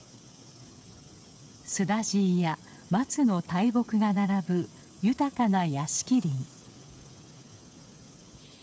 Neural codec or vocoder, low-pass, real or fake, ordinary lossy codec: codec, 16 kHz, 8 kbps, FreqCodec, smaller model; none; fake; none